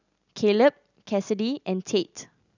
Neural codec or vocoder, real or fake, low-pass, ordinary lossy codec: none; real; 7.2 kHz; none